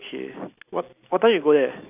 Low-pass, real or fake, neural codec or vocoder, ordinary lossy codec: 3.6 kHz; real; none; none